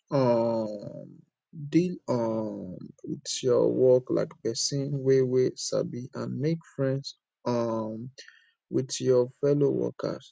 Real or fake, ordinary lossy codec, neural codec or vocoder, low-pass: real; none; none; none